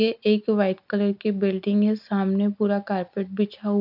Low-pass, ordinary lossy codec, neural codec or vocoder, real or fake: 5.4 kHz; none; none; real